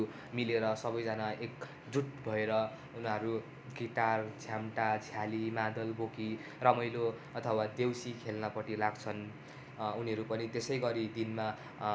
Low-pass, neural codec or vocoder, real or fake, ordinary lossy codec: none; none; real; none